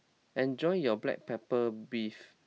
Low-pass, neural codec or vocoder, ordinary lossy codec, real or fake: none; none; none; real